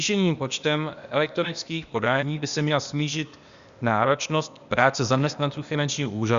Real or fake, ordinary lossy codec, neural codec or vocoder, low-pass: fake; Opus, 64 kbps; codec, 16 kHz, 0.8 kbps, ZipCodec; 7.2 kHz